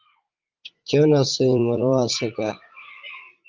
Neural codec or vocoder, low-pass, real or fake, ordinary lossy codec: vocoder, 44.1 kHz, 80 mel bands, Vocos; 7.2 kHz; fake; Opus, 32 kbps